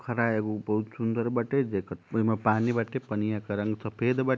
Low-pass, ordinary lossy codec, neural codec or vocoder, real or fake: none; none; none; real